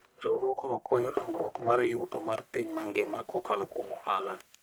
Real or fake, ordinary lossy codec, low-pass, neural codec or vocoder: fake; none; none; codec, 44.1 kHz, 1.7 kbps, Pupu-Codec